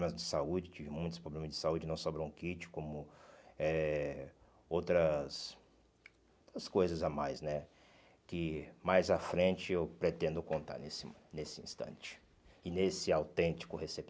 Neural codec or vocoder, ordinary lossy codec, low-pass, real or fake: none; none; none; real